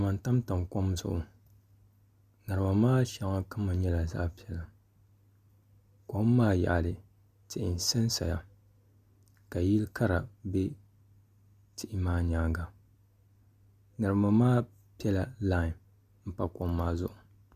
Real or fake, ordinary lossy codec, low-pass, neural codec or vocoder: real; Opus, 64 kbps; 14.4 kHz; none